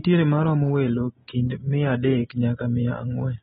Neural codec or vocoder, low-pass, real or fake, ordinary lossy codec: none; 7.2 kHz; real; AAC, 16 kbps